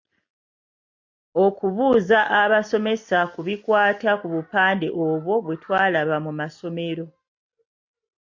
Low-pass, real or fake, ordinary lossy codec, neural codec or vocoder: 7.2 kHz; real; MP3, 48 kbps; none